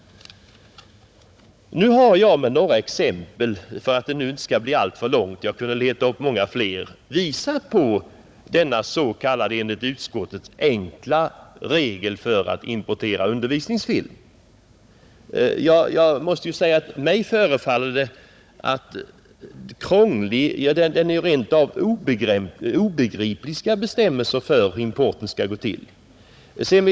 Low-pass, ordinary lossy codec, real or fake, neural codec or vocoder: none; none; fake; codec, 16 kHz, 16 kbps, FunCodec, trained on Chinese and English, 50 frames a second